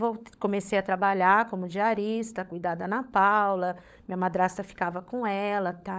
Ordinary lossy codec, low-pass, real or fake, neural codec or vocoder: none; none; fake; codec, 16 kHz, 16 kbps, FunCodec, trained on LibriTTS, 50 frames a second